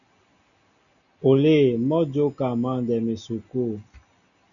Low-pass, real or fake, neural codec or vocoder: 7.2 kHz; real; none